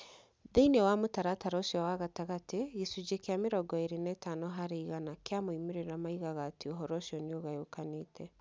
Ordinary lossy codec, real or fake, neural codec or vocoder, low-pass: none; real; none; none